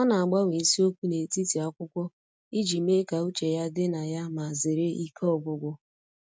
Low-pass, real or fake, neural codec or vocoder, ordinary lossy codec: none; real; none; none